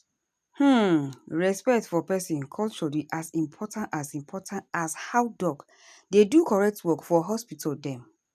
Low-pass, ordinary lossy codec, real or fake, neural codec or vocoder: 14.4 kHz; none; real; none